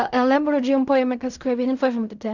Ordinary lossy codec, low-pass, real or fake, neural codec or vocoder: none; 7.2 kHz; fake; codec, 16 kHz in and 24 kHz out, 0.4 kbps, LongCat-Audio-Codec, fine tuned four codebook decoder